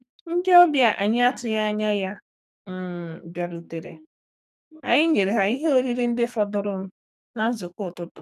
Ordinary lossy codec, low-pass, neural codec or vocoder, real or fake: none; 14.4 kHz; codec, 44.1 kHz, 2.6 kbps, SNAC; fake